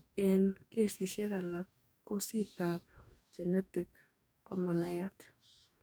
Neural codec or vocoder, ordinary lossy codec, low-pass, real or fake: codec, 44.1 kHz, 2.6 kbps, DAC; none; none; fake